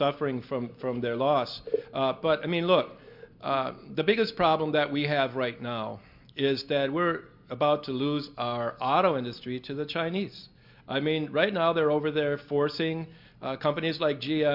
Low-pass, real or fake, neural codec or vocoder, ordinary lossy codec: 5.4 kHz; real; none; MP3, 48 kbps